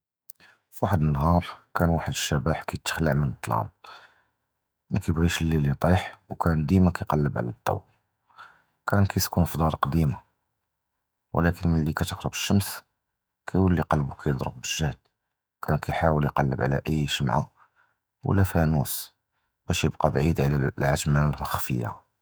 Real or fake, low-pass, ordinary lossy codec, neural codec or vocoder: fake; none; none; autoencoder, 48 kHz, 128 numbers a frame, DAC-VAE, trained on Japanese speech